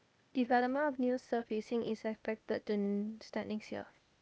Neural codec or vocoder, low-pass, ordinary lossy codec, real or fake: codec, 16 kHz, 0.8 kbps, ZipCodec; none; none; fake